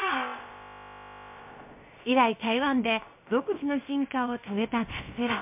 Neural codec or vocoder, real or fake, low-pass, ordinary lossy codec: codec, 16 kHz, about 1 kbps, DyCAST, with the encoder's durations; fake; 3.6 kHz; MP3, 32 kbps